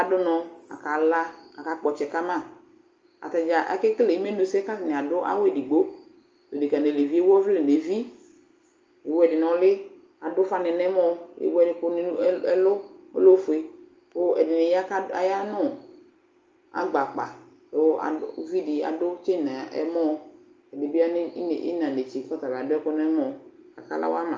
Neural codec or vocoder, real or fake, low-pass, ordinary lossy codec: none; real; 7.2 kHz; Opus, 24 kbps